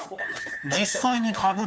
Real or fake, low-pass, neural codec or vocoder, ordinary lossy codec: fake; none; codec, 16 kHz, 4 kbps, FunCodec, trained on LibriTTS, 50 frames a second; none